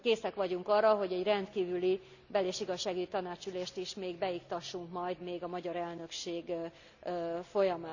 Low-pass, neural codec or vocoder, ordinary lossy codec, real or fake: 7.2 kHz; none; none; real